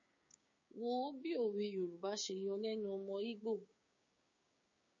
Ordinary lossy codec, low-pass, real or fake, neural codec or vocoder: MP3, 32 kbps; 7.2 kHz; fake; codec, 16 kHz, 6 kbps, DAC